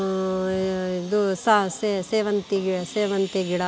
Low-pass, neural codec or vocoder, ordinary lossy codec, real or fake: none; none; none; real